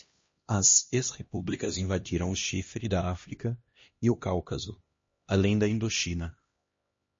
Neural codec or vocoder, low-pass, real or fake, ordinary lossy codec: codec, 16 kHz, 1 kbps, X-Codec, HuBERT features, trained on LibriSpeech; 7.2 kHz; fake; MP3, 32 kbps